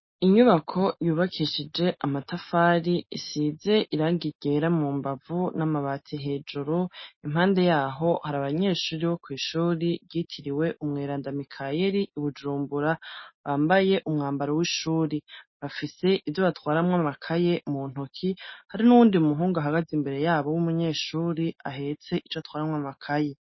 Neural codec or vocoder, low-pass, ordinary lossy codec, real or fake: none; 7.2 kHz; MP3, 24 kbps; real